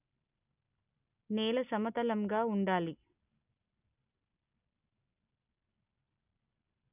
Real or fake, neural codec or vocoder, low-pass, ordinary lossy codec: real; none; 3.6 kHz; none